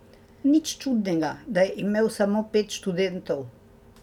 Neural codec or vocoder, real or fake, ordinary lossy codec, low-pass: none; real; none; 19.8 kHz